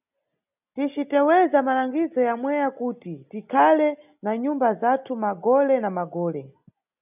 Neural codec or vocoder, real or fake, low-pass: none; real; 3.6 kHz